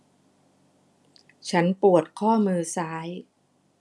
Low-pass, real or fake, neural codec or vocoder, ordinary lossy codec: none; real; none; none